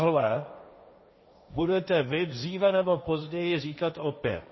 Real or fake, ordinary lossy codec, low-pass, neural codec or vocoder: fake; MP3, 24 kbps; 7.2 kHz; codec, 16 kHz, 1.1 kbps, Voila-Tokenizer